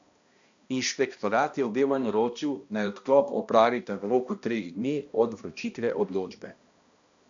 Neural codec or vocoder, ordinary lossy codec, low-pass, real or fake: codec, 16 kHz, 1 kbps, X-Codec, HuBERT features, trained on balanced general audio; AAC, 64 kbps; 7.2 kHz; fake